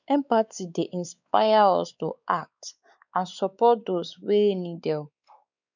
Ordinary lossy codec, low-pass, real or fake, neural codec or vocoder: none; 7.2 kHz; fake; codec, 16 kHz, 4 kbps, X-Codec, WavLM features, trained on Multilingual LibriSpeech